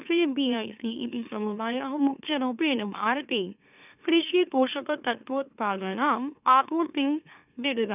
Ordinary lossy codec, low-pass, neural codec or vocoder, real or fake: none; 3.6 kHz; autoencoder, 44.1 kHz, a latent of 192 numbers a frame, MeloTTS; fake